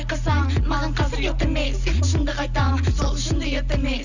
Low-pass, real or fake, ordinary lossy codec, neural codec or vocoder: 7.2 kHz; real; none; none